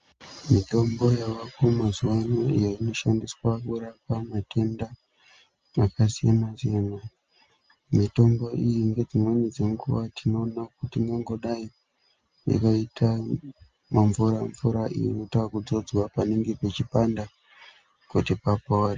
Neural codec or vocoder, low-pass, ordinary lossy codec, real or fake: none; 7.2 kHz; Opus, 24 kbps; real